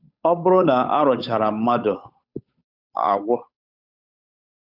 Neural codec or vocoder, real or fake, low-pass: codec, 16 kHz, 8 kbps, FunCodec, trained on Chinese and English, 25 frames a second; fake; 5.4 kHz